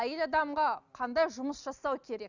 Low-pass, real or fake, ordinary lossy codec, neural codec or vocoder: 7.2 kHz; real; none; none